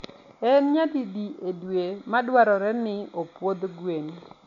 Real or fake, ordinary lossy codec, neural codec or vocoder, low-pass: real; none; none; 7.2 kHz